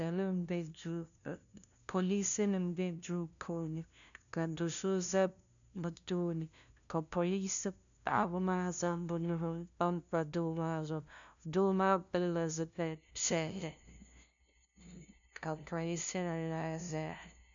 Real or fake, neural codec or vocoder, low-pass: fake; codec, 16 kHz, 0.5 kbps, FunCodec, trained on LibriTTS, 25 frames a second; 7.2 kHz